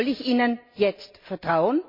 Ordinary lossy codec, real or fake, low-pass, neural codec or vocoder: MP3, 32 kbps; real; 5.4 kHz; none